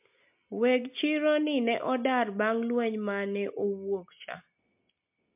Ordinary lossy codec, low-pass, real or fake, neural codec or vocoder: MP3, 32 kbps; 3.6 kHz; real; none